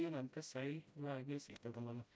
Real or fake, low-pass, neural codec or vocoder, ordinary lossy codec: fake; none; codec, 16 kHz, 0.5 kbps, FreqCodec, smaller model; none